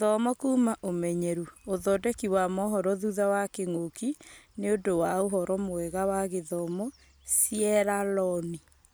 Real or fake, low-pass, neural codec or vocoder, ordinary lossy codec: real; none; none; none